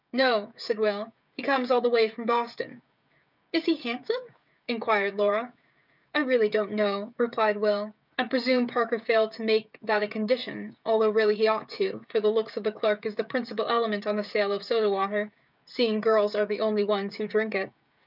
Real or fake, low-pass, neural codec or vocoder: fake; 5.4 kHz; codec, 16 kHz, 16 kbps, FreqCodec, smaller model